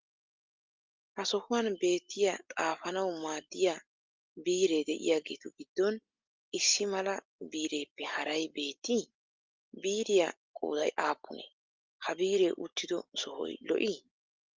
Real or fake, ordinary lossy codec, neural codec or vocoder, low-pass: real; Opus, 32 kbps; none; 7.2 kHz